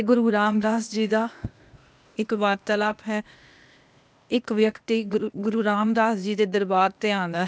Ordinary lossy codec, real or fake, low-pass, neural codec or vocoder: none; fake; none; codec, 16 kHz, 0.8 kbps, ZipCodec